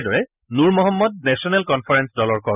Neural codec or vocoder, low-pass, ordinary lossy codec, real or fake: none; 3.6 kHz; none; real